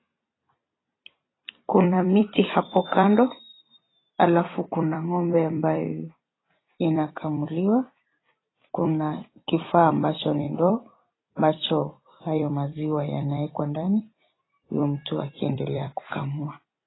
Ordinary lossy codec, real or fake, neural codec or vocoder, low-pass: AAC, 16 kbps; fake; vocoder, 44.1 kHz, 128 mel bands every 256 samples, BigVGAN v2; 7.2 kHz